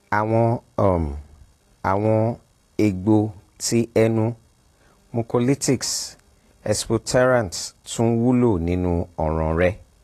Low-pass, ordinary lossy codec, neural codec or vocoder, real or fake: 14.4 kHz; AAC, 48 kbps; none; real